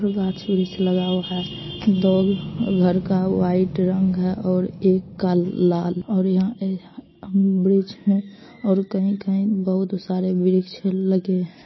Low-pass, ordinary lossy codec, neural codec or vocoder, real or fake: 7.2 kHz; MP3, 24 kbps; none; real